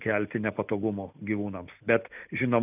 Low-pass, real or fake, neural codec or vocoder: 3.6 kHz; real; none